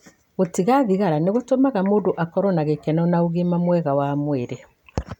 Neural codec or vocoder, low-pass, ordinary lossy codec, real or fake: none; 19.8 kHz; none; real